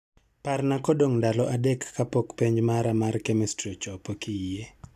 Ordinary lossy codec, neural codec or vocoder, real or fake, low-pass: AAC, 96 kbps; none; real; 14.4 kHz